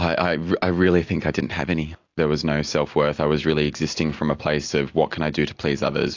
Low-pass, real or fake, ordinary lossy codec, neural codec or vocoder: 7.2 kHz; real; AAC, 48 kbps; none